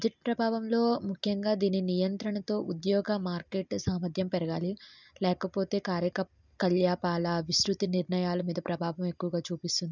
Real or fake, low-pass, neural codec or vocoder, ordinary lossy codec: real; 7.2 kHz; none; none